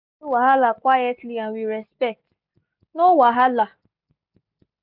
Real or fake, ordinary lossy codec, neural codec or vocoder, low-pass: real; none; none; 5.4 kHz